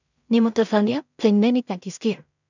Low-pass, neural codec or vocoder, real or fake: 7.2 kHz; codec, 16 kHz in and 24 kHz out, 0.4 kbps, LongCat-Audio-Codec, two codebook decoder; fake